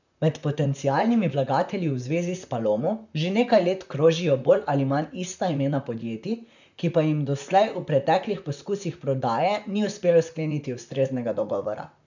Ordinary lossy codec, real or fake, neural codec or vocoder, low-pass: none; fake; vocoder, 44.1 kHz, 128 mel bands, Pupu-Vocoder; 7.2 kHz